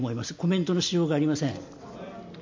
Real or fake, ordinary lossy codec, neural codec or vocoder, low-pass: real; MP3, 48 kbps; none; 7.2 kHz